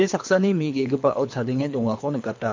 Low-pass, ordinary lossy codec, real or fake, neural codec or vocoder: 7.2 kHz; MP3, 48 kbps; fake; codec, 24 kHz, 3 kbps, HILCodec